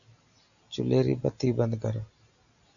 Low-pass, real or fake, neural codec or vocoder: 7.2 kHz; real; none